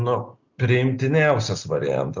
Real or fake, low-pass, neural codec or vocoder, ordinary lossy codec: real; 7.2 kHz; none; Opus, 64 kbps